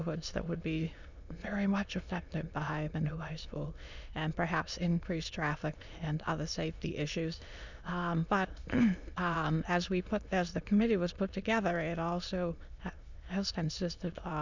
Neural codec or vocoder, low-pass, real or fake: autoencoder, 22.05 kHz, a latent of 192 numbers a frame, VITS, trained on many speakers; 7.2 kHz; fake